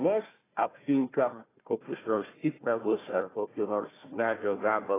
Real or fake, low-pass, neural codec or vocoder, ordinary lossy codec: fake; 3.6 kHz; codec, 16 kHz, 1 kbps, FunCodec, trained on Chinese and English, 50 frames a second; AAC, 16 kbps